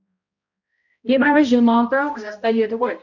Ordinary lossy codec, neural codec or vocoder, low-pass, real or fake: none; codec, 16 kHz, 0.5 kbps, X-Codec, HuBERT features, trained on balanced general audio; 7.2 kHz; fake